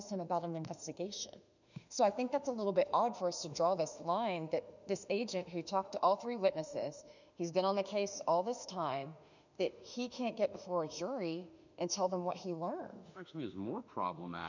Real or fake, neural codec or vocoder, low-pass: fake; autoencoder, 48 kHz, 32 numbers a frame, DAC-VAE, trained on Japanese speech; 7.2 kHz